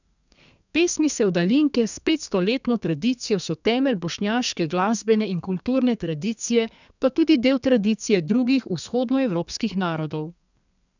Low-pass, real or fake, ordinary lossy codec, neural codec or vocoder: 7.2 kHz; fake; none; codec, 32 kHz, 1.9 kbps, SNAC